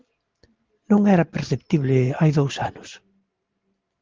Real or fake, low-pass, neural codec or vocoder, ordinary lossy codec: real; 7.2 kHz; none; Opus, 16 kbps